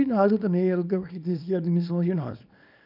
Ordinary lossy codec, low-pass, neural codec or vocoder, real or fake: none; 5.4 kHz; codec, 24 kHz, 0.9 kbps, WavTokenizer, small release; fake